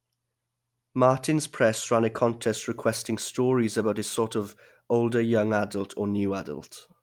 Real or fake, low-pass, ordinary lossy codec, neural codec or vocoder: real; 19.8 kHz; Opus, 32 kbps; none